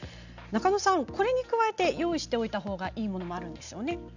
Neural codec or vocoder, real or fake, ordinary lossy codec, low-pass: none; real; none; 7.2 kHz